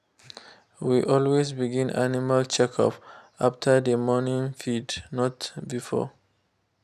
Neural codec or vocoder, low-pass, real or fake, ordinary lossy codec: none; 14.4 kHz; real; none